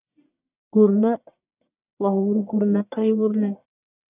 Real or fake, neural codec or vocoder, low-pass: fake; codec, 44.1 kHz, 1.7 kbps, Pupu-Codec; 3.6 kHz